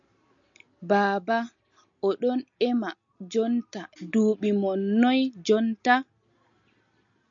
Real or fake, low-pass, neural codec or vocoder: real; 7.2 kHz; none